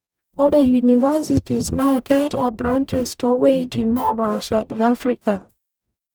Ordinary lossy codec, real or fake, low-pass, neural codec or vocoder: none; fake; none; codec, 44.1 kHz, 0.9 kbps, DAC